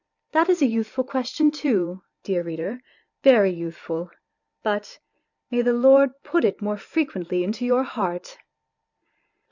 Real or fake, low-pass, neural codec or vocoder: fake; 7.2 kHz; vocoder, 44.1 kHz, 128 mel bands every 512 samples, BigVGAN v2